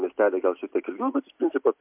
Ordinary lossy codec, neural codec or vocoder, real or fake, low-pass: MP3, 32 kbps; none; real; 3.6 kHz